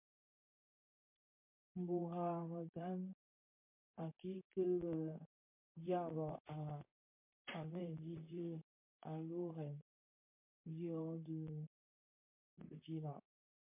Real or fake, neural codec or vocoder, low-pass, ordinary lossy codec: fake; vocoder, 44.1 kHz, 128 mel bands, Pupu-Vocoder; 3.6 kHz; MP3, 32 kbps